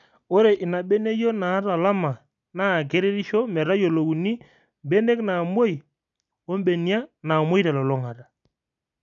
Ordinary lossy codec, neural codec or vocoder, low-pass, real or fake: none; none; 7.2 kHz; real